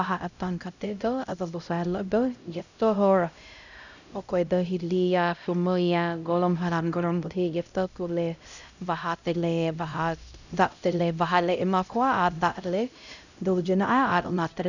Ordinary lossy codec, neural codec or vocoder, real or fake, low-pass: none; codec, 16 kHz, 0.5 kbps, X-Codec, HuBERT features, trained on LibriSpeech; fake; 7.2 kHz